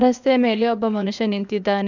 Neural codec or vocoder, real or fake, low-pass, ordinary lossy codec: codec, 16 kHz, 0.8 kbps, ZipCodec; fake; 7.2 kHz; none